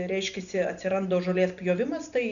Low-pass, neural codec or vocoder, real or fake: 7.2 kHz; none; real